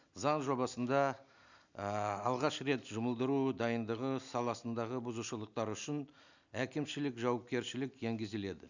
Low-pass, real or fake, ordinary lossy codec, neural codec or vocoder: 7.2 kHz; real; none; none